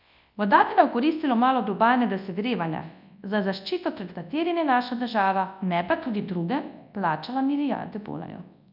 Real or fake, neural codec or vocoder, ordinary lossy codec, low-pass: fake; codec, 24 kHz, 0.9 kbps, WavTokenizer, large speech release; none; 5.4 kHz